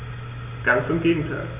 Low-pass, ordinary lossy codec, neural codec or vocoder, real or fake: 3.6 kHz; none; none; real